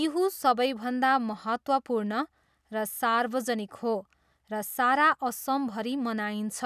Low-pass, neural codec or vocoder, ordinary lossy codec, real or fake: 14.4 kHz; none; none; real